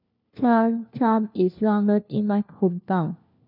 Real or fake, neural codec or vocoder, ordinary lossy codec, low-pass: fake; codec, 16 kHz, 1 kbps, FunCodec, trained on LibriTTS, 50 frames a second; none; 5.4 kHz